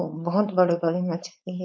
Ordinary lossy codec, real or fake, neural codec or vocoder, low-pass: none; fake; codec, 16 kHz, 4.8 kbps, FACodec; none